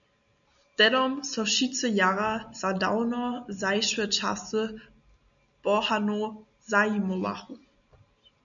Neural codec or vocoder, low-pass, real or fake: none; 7.2 kHz; real